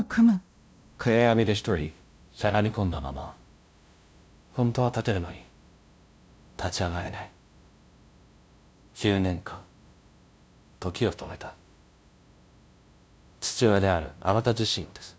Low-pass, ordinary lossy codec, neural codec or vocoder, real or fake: none; none; codec, 16 kHz, 0.5 kbps, FunCodec, trained on LibriTTS, 25 frames a second; fake